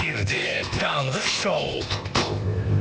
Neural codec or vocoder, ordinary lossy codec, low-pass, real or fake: codec, 16 kHz, 0.8 kbps, ZipCodec; none; none; fake